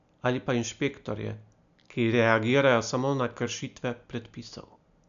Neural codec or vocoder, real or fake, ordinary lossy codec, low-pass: none; real; MP3, 96 kbps; 7.2 kHz